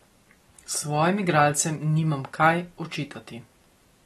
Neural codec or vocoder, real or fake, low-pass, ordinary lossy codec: none; real; 19.8 kHz; AAC, 32 kbps